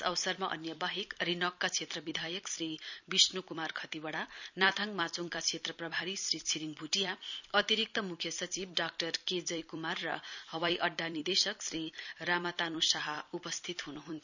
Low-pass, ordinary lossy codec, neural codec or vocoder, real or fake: 7.2 kHz; none; vocoder, 44.1 kHz, 128 mel bands every 256 samples, BigVGAN v2; fake